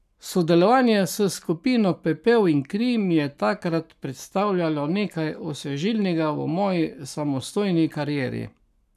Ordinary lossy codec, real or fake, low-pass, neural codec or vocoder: none; fake; 14.4 kHz; codec, 44.1 kHz, 7.8 kbps, Pupu-Codec